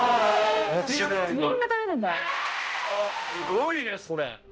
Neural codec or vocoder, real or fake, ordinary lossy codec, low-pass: codec, 16 kHz, 0.5 kbps, X-Codec, HuBERT features, trained on balanced general audio; fake; none; none